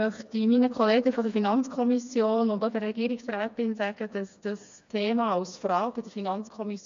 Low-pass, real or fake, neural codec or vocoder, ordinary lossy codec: 7.2 kHz; fake; codec, 16 kHz, 2 kbps, FreqCodec, smaller model; MP3, 64 kbps